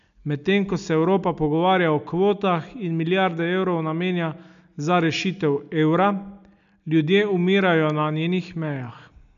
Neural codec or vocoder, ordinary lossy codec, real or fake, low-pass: none; none; real; 7.2 kHz